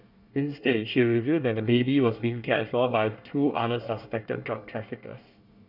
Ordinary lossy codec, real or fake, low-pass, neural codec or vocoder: none; fake; 5.4 kHz; codec, 24 kHz, 1 kbps, SNAC